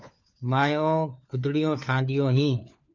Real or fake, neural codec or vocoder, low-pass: fake; codec, 16 kHz, 4 kbps, FunCodec, trained on LibriTTS, 50 frames a second; 7.2 kHz